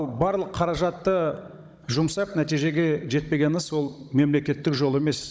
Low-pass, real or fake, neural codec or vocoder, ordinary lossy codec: none; fake; codec, 16 kHz, 16 kbps, FunCodec, trained on Chinese and English, 50 frames a second; none